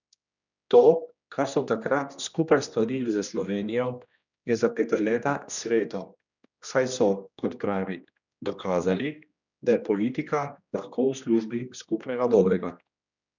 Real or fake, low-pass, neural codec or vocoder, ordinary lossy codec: fake; 7.2 kHz; codec, 16 kHz, 1 kbps, X-Codec, HuBERT features, trained on general audio; none